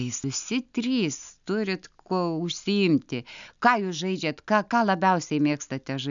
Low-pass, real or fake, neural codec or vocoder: 7.2 kHz; real; none